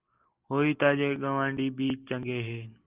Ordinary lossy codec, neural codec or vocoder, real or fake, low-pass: Opus, 24 kbps; none; real; 3.6 kHz